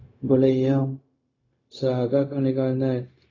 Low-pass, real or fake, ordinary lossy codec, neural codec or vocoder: 7.2 kHz; fake; AAC, 32 kbps; codec, 16 kHz, 0.4 kbps, LongCat-Audio-Codec